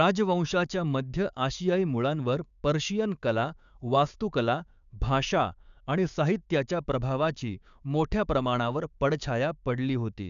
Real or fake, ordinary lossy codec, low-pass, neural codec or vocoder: fake; none; 7.2 kHz; codec, 16 kHz, 6 kbps, DAC